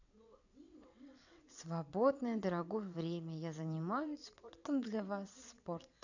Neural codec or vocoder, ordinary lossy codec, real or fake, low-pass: vocoder, 22.05 kHz, 80 mel bands, WaveNeXt; none; fake; 7.2 kHz